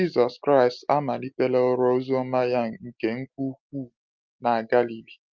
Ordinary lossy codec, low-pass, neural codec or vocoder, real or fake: Opus, 24 kbps; 7.2 kHz; none; real